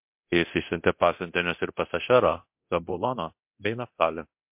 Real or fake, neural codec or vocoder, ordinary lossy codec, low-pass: fake; codec, 24 kHz, 0.9 kbps, DualCodec; MP3, 32 kbps; 3.6 kHz